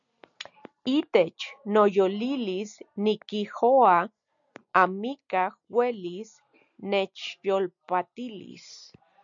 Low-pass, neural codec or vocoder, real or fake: 7.2 kHz; none; real